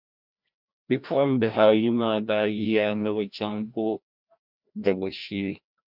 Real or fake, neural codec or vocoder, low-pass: fake; codec, 16 kHz, 1 kbps, FreqCodec, larger model; 5.4 kHz